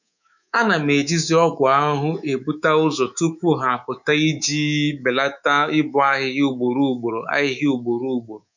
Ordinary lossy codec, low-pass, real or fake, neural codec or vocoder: none; 7.2 kHz; fake; codec, 24 kHz, 3.1 kbps, DualCodec